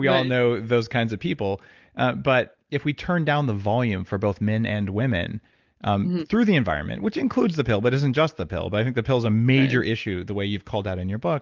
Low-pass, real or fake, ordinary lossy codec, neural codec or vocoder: 7.2 kHz; real; Opus, 32 kbps; none